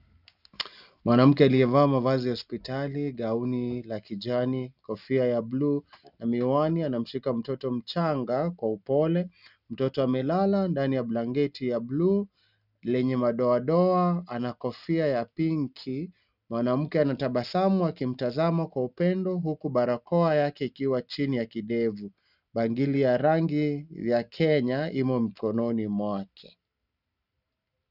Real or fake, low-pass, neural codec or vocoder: real; 5.4 kHz; none